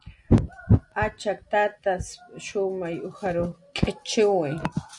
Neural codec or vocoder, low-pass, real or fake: none; 10.8 kHz; real